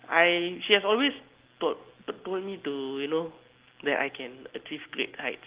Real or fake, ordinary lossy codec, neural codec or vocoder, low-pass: real; Opus, 16 kbps; none; 3.6 kHz